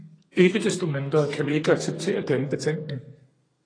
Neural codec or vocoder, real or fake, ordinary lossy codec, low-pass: codec, 32 kHz, 1.9 kbps, SNAC; fake; AAC, 32 kbps; 9.9 kHz